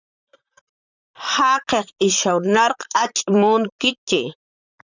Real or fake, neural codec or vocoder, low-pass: fake; vocoder, 44.1 kHz, 128 mel bands, Pupu-Vocoder; 7.2 kHz